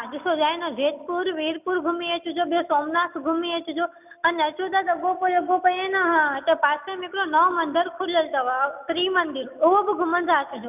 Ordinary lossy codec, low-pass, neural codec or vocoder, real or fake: none; 3.6 kHz; none; real